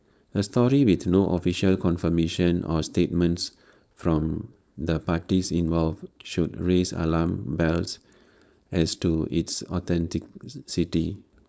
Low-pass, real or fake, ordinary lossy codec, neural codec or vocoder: none; fake; none; codec, 16 kHz, 4.8 kbps, FACodec